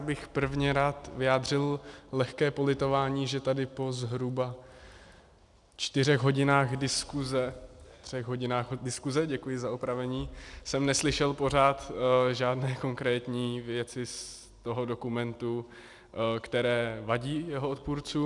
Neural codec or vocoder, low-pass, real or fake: none; 10.8 kHz; real